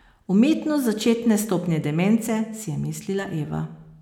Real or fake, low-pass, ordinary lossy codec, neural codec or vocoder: real; 19.8 kHz; none; none